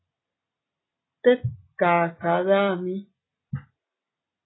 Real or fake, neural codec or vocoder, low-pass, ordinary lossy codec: real; none; 7.2 kHz; AAC, 16 kbps